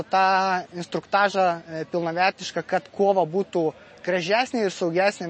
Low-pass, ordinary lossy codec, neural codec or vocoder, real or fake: 9.9 kHz; MP3, 32 kbps; none; real